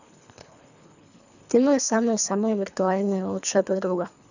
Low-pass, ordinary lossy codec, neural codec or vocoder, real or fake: 7.2 kHz; none; codec, 24 kHz, 3 kbps, HILCodec; fake